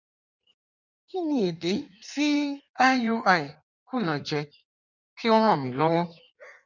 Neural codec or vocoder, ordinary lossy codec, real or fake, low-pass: codec, 16 kHz in and 24 kHz out, 1.1 kbps, FireRedTTS-2 codec; none; fake; 7.2 kHz